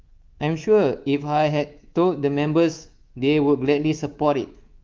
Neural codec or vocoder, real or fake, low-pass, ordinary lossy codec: codec, 24 kHz, 3.1 kbps, DualCodec; fake; 7.2 kHz; Opus, 32 kbps